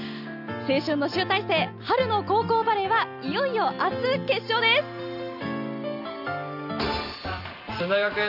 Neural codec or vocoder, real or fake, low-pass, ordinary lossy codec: none; real; 5.4 kHz; none